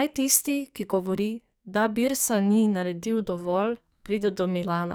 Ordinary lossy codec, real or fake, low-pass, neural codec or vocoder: none; fake; none; codec, 44.1 kHz, 2.6 kbps, SNAC